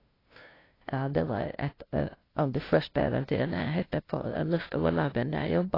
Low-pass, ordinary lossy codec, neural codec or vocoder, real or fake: 5.4 kHz; AAC, 24 kbps; codec, 16 kHz, 0.5 kbps, FunCodec, trained on LibriTTS, 25 frames a second; fake